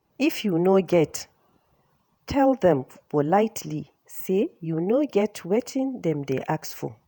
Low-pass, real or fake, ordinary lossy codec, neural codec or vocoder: none; fake; none; vocoder, 48 kHz, 128 mel bands, Vocos